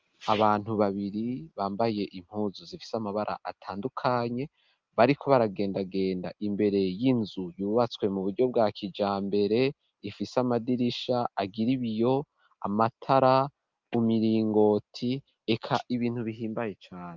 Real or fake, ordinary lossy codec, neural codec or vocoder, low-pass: real; Opus, 24 kbps; none; 7.2 kHz